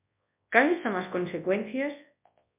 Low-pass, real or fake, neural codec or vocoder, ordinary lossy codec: 3.6 kHz; fake; codec, 24 kHz, 0.9 kbps, WavTokenizer, large speech release; MP3, 32 kbps